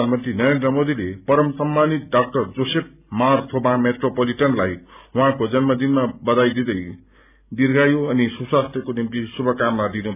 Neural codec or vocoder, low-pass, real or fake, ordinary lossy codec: none; 3.6 kHz; real; none